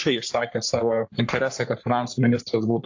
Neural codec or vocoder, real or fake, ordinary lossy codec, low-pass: codec, 44.1 kHz, 3.4 kbps, Pupu-Codec; fake; AAC, 48 kbps; 7.2 kHz